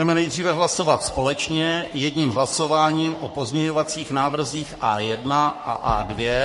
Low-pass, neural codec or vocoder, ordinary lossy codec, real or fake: 14.4 kHz; codec, 44.1 kHz, 3.4 kbps, Pupu-Codec; MP3, 48 kbps; fake